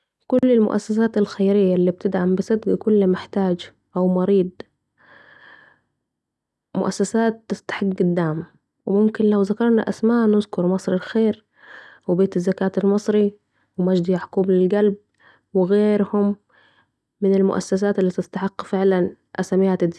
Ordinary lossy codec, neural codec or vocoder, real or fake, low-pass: none; none; real; none